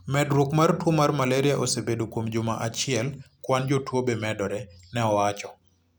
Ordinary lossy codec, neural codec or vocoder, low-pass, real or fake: none; none; none; real